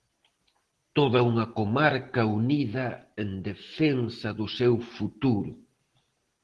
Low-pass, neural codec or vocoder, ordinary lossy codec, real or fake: 10.8 kHz; none; Opus, 16 kbps; real